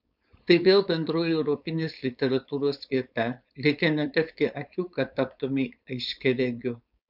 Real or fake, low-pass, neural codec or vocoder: fake; 5.4 kHz; codec, 16 kHz, 4.8 kbps, FACodec